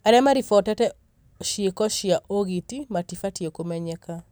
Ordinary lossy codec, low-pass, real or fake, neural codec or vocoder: none; none; real; none